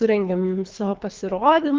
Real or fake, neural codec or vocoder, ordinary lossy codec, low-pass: fake; codec, 24 kHz, 3 kbps, HILCodec; Opus, 32 kbps; 7.2 kHz